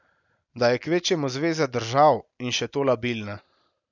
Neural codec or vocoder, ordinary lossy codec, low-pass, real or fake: none; none; 7.2 kHz; real